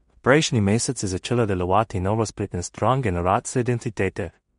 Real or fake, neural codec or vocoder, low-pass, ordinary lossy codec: fake; codec, 16 kHz in and 24 kHz out, 0.4 kbps, LongCat-Audio-Codec, two codebook decoder; 10.8 kHz; MP3, 48 kbps